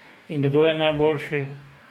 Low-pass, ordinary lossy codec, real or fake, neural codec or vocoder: 19.8 kHz; MP3, 96 kbps; fake; codec, 44.1 kHz, 2.6 kbps, DAC